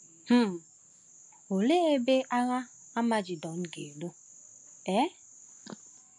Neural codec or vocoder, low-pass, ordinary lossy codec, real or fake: none; 10.8 kHz; MP3, 64 kbps; real